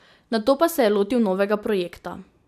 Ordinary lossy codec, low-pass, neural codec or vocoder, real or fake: none; 14.4 kHz; none; real